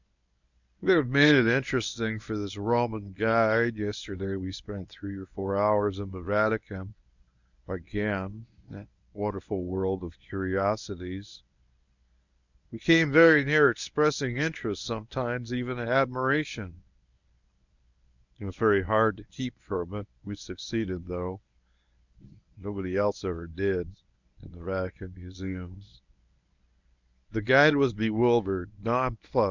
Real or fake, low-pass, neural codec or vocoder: fake; 7.2 kHz; codec, 24 kHz, 0.9 kbps, WavTokenizer, medium speech release version 1